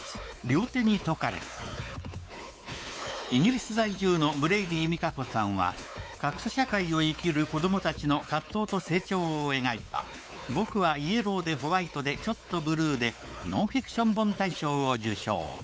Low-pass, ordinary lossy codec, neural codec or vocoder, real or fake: none; none; codec, 16 kHz, 4 kbps, X-Codec, WavLM features, trained on Multilingual LibriSpeech; fake